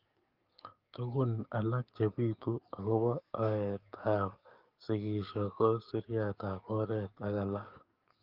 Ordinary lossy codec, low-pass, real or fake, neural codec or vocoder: Opus, 32 kbps; 5.4 kHz; fake; codec, 44.1 kHz, 7.8 kbps, DAC